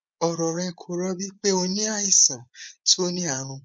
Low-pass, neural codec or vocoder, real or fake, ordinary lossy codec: 9.9 kHz; vocoder, 24 kHz, 100 mel bands, Vocos; fake; none